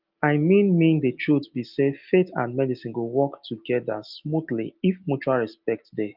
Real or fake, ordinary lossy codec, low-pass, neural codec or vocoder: real; Opus, 24 kbps; 5.4 kHz; none